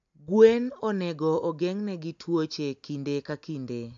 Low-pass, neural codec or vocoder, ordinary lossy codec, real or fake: 7.2 kHz; none; AAC, 64 kbps; real